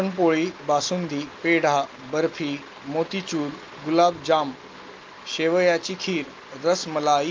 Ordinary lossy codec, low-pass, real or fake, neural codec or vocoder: Opus, 24 kbps; 7.2 kHz; real; none